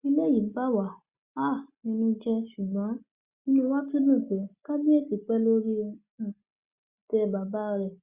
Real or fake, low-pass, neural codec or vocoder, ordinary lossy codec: real; 3.6 kHz; none; Opus, 64 kbps